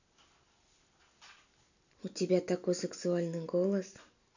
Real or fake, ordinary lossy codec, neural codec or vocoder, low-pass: real; none; none; 7.2 kHz